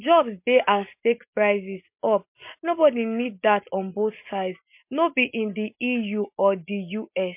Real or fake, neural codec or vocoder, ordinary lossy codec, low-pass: real; none; MP3, 32 kbps; 3.6 kHz